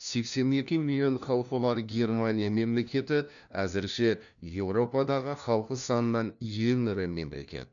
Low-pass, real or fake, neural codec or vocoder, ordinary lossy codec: 7.2 kHz; fake; codec, 16 kHz, 1 kbps, FunCodec, trained on LibriTTS, 50 frames a second; none